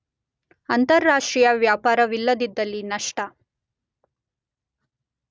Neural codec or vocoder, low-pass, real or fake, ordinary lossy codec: none; none; real; none